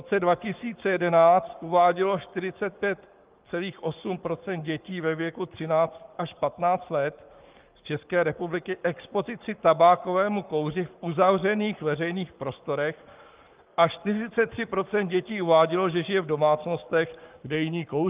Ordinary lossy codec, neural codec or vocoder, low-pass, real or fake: Opus, 32 kbps; codec, 44.1 kHz, 7.8 kbps, Pupu-Codec; 3.6 kHz; fake